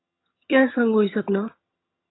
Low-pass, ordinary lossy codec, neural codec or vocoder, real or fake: 7.2 kHz; AAC, 16 kbps; vocoder, 22.05 kHz, 80 mel bands, HiFi-GAN; fake